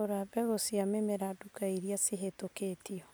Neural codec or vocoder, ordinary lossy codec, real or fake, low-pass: none; none; real; none